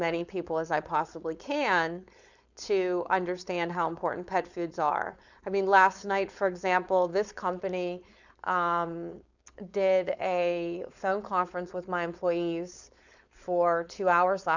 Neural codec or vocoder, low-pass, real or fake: codec, 16 kHz, 4.8 kbps, FACodec; 7.2 kHz; fake